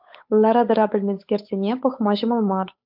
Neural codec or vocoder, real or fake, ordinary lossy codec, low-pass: codec, 16 kHz, 8 kbps, FunCodec, trained on Chinese and English, 25 frames a second; fake; AAC, 48 kbps; 5.4 kHz